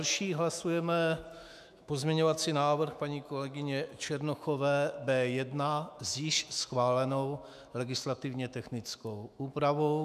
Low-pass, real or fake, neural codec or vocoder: 14.4 kHz; fake; autoencoder, 48 kHz, 128 numbers a frame, DAC-VAE, trained on Japanese speech